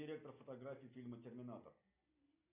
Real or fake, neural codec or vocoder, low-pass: real; none; 3.6 kHz